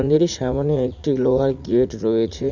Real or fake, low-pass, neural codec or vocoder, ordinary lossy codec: fake; 7.2 kHz; vocoder, 44.1 kHz, 80 mel bands, Vocos; none